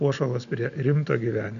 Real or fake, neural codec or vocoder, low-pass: real; none; 7.2 kHz